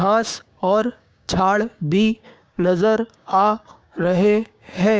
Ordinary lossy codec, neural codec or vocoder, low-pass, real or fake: none; codec, 16 kHz, 6 kbps, DAC; none; fake